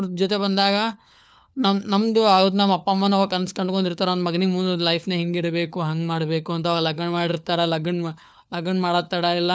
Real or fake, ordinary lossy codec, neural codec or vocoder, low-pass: fake; none; codec, 16 kHz, 4 kbps, FunCodec, trained on LibriTTS, 50 frames a second; none